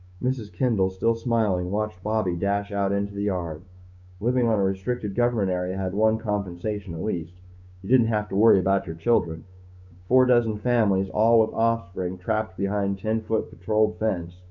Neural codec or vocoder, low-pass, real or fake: codec, 16 kHz, 6 kbps, DAC; 7.2 kHz; fake